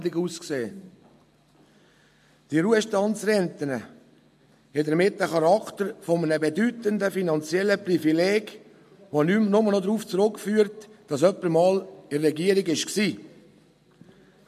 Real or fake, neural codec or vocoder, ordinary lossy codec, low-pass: real; none; MP3, 64 kbps; 14.4 kHz